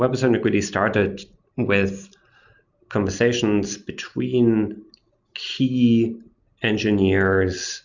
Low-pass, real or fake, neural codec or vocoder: 7.2 kHz; real; none